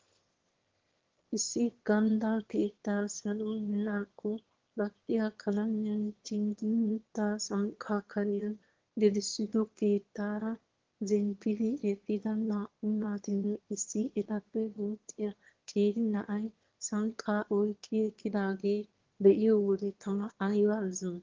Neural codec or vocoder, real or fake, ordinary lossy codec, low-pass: autoencoder, 22.05 kHz, a latent of 192 numbers a frame, VITS, trained on one speaker; fake; Opus, 16 kbps; 7.2 kHz